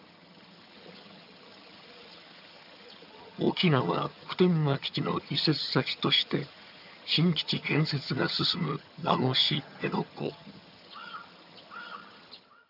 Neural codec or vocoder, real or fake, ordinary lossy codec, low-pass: vocoder, 22.05 kHz, 80 mel bands, HiFi-GAN; fake; none; 5.4 kHz